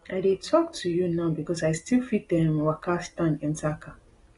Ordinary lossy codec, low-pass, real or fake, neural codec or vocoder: AAC, 32 kbps; 10.8 kHz; real; none